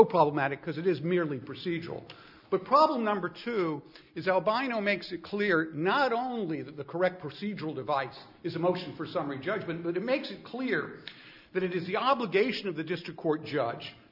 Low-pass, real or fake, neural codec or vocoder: 5.4 kHz; real; none